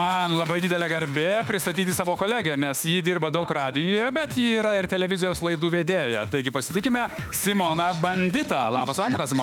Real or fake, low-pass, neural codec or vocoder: fake; 19.8 kHz; autoencoder, 48 kHz, 32 numbers a frame, DAC-VAE, trained on Japanese speech